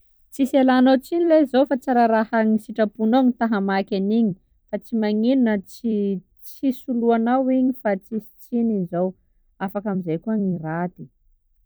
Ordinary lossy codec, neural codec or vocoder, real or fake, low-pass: none; vocoder, 44.1 kHz, 128 mel bands every 256 samples, BigVGAN v2; fake; none